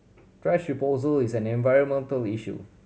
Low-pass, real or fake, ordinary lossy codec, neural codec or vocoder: none; real; none; none